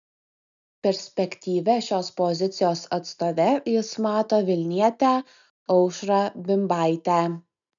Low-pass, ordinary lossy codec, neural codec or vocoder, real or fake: 7.2 kHz; MP3, 96 kbps; none; real